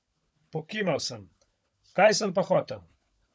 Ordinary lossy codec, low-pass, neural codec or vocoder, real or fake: none; none; codec, 16 kHz, 16 kbps, FunCodec, trained on LibriTTS, 50 frames a second; fake